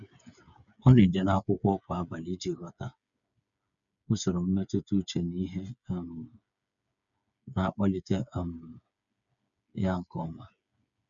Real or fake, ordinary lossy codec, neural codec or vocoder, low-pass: fake; none; codec, 16 kHz, 8 kbps, FreqCodec, smaller model; 7.2 kHz